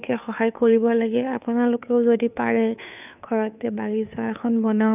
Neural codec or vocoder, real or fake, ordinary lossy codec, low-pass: codec, 16 kHz, 2 kbps, FunCodec, trained on Chinese and English, 25 frames a second; fake; none; 3.6 kHz